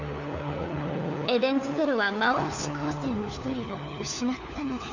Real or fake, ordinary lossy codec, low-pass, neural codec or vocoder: fake; none; 7.2 kHz; codec, 16 kHz, 4 kbps, FunCodec, trained on LibriTTS, 50 frames a second